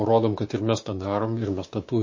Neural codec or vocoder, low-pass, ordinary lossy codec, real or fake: codec, 16 kHz, 6 kbps, DAC; 7.2 kHz; MP3, 32 kbps; fake